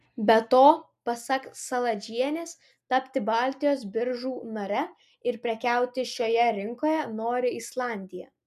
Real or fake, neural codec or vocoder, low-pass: real; none; 14.4 kHz